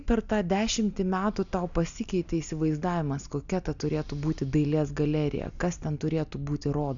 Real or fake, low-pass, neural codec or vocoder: real; 7.2 kHz; none